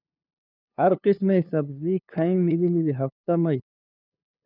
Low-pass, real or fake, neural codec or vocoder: 5.4 kHz; fake; codec, 16 kHz, 2 kbps, FunCodec, trained on LibriTTS, 25 frames a second